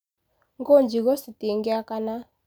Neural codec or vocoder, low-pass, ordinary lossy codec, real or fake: none; none; none; real